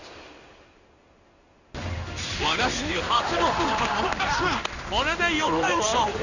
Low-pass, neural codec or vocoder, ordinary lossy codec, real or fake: 7.2 kHz; codec, 16 kHz in and 24 kHz out, 1 kbps, XY-Tokenizer; none; fake